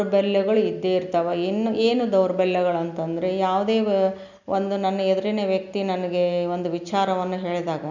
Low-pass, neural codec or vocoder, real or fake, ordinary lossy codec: 7.2 kHz; none; real; none